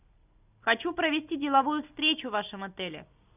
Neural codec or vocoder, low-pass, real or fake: none; 3.6 kHz; real